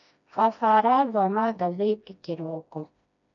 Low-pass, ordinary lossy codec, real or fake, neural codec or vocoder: 7.2 kHz; none; fake; codec, 16 kHz, 1 kbps, FreqCodec, smaller model